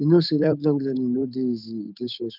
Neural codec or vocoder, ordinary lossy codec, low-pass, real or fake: codec, 16 kHz, 8 kbps, FunCodec, trained on Chinese and English, 25 frames a second; none; 5.4 kHz; fake